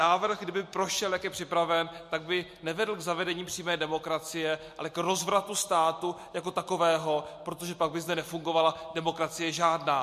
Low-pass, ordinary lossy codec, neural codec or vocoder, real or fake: 14.4 kHz; MP3, 64 kbps; none; real